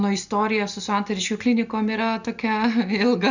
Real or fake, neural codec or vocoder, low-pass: real; none; 7.2 kHz